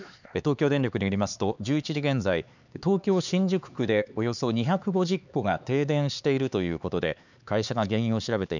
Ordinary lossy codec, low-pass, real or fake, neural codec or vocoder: none; 7.2 kHz; fake; codec, 16 kHz, 4 kbps, X-Codec, HuBERT features, trained on LibriSpeech